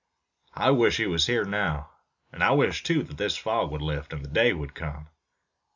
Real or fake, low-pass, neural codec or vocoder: real; 7.2 kHz; none